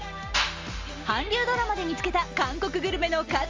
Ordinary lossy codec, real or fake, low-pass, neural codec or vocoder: Opus, 32 kbps; real; 7.2 kHz; none